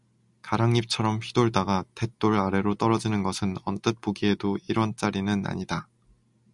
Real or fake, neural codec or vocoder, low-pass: real; none; 10.8 kHz